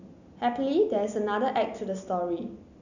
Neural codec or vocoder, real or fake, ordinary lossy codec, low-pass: none; real; none; 7.2 kHz